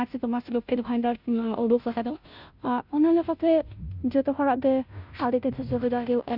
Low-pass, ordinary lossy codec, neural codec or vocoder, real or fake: 5.4 kHz; none; codec, 16 kHz, 0.5 kbps, FunCodec, trained on Chinese and English, 25 frames a second; fake